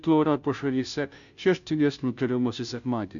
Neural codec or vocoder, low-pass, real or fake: codec, 16 kHz, 0.5 kbps, FunCodec, trained on Chinese and English, 25 frames a second; 7.2 kHz; fake